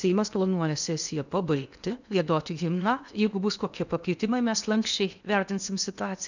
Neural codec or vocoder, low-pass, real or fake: codec, 16 kHz in and 24 kHz out, 0.8 kbps, FocalCodec, streaming, 65536 codes; 7.2 kHz; fake